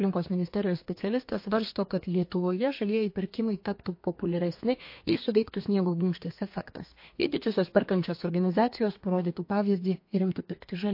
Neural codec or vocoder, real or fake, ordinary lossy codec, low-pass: codec, 32 kHz, 1.9 kbps, SNAC; fake; MP3, 32 kbps; 5.4 kHz